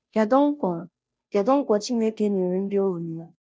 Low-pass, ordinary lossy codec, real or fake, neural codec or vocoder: none; none; fake; codec, 16 kHz, 0.5 kbps, FunCodec, trained on Chinese and English, 25 frames a second